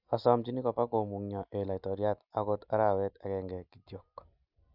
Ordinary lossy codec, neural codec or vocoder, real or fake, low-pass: none; none; real; 5.4 kHz